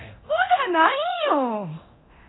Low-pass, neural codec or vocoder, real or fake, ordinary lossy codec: 7.2 kHz; codec, 24 kHz, 0.9 kbps, DualCodec; fake; AAC, 16 kbps